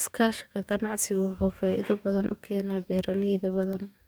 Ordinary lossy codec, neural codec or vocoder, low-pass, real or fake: none; codec, 44.1 kHz, 2.6 kbps, DAC; none; fake